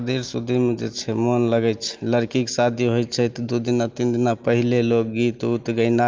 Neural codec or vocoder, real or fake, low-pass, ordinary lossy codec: none; real; 7.2 kHz; Opus, 24 kbps